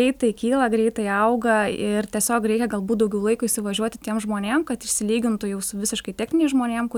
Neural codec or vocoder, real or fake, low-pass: none; real; 19.8 kHz